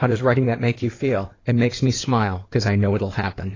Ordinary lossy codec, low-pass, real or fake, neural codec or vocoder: AAC, 32 kbps; 7.2 kHz; fake; codec, 16 kHz in and 24 kHz out, 2.2 kbps, FireRedTTS-2 codec